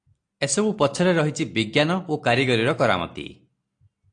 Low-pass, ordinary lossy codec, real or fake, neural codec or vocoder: 9.9 kHz; AAC, 48 kbps; real; none